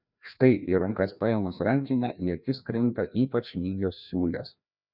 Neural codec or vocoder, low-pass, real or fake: codec, 16 kHz, 1 kbps, FreqCodec, larger model; 5.4 kHz; fake